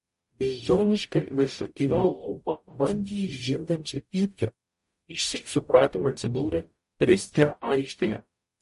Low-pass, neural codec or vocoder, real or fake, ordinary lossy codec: 14.4 kHz; codec, 44.1 kHz, 0.9 kbps, DAC; fake; MP3, 48 kbps